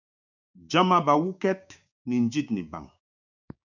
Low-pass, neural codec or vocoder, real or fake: 7.2 kHz; codec, 16 kHz, 6 kbps, DAC; fake